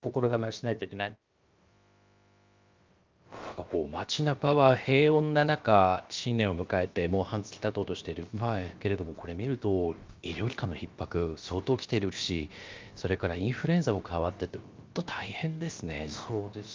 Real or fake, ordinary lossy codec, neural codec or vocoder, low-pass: fake; Opus, 24 kbps; codec, 16 kHz, about 1 kbps, DyCAST, with the encoder's durations; 7.2 kHz